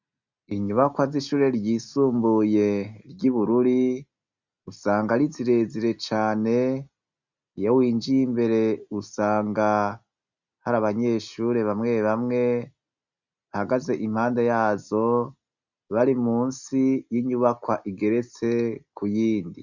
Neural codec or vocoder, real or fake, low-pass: none; real; 7.2 kHz